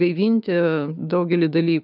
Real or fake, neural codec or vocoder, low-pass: fake; codec, 16 kHz, 6 kbps, DAC; 5.4 kHz